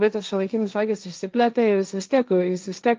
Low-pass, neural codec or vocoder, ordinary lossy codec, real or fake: 7.2 kHz; codec, 16 kHz, 1.1 kbps, Voila-Tokenizer; Opus, 24 kbps; fake